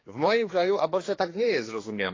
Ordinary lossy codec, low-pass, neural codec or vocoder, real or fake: AAC, 32 kbps; 7.2 kHz; codec, 16 kHz, 2 kbps, X-Codec, HuBERT features, trained on general audio; fake